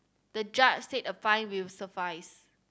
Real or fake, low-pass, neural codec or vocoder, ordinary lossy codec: real; none; none; none